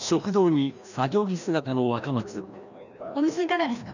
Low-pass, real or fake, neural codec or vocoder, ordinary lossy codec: 7.2 kHz; fake; codec, 16 kHz, 1 kbps, FreqCodec, larger model; none